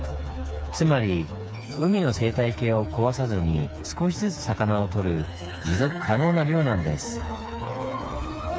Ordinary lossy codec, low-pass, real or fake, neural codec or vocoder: none; none; fake; codec, 16 kHz, 4 kbps, FreqCodec, smaller model